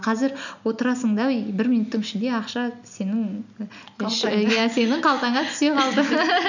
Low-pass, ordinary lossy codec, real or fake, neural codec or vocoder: 7.2 kHz; none; real; none